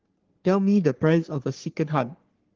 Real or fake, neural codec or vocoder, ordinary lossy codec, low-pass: fake; codec, 44.1 kHz, 3.4 kbps, Pupu-Codec; Opus, 16 kbps; 7.2 kHz